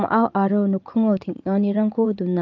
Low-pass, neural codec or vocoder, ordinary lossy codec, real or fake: 7.2 kHz; vocoder, 44.1 kHz, 80 mel bands, Vocos; Opus, 24 kbps; fake